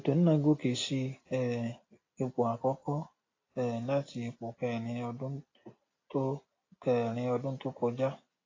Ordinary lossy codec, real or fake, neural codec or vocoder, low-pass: AAC, 32 kbps; real; none; 7.2 kHz